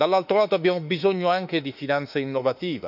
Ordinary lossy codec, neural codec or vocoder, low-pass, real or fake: none; autoencoder, 48 kHz, 32 numbers a frame, DAC-VAE, trained on Japanese speech; 5.4 kHz; fake